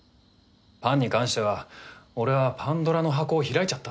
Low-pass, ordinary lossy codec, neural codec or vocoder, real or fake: none; none; none; real